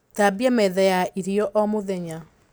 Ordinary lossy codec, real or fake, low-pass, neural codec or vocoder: none; real; none; none